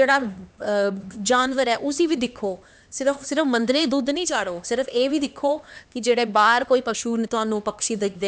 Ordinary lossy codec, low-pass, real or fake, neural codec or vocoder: none; none; fake; codec, 16 kHz, 2 kbps, X-Codec, HuBERT features, trained on LibriSpeech